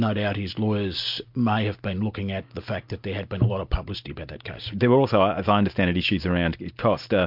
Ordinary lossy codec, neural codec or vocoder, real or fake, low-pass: MP3, 48 kbps; none; real; 5.4 kHz